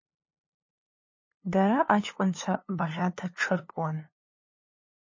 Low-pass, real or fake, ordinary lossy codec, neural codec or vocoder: 7.2 kHz; fake; MP3, 32 kbps; codec, 16 kHz, 2 kbps, FunCodec, trained on LibriTTS, 25 frames a second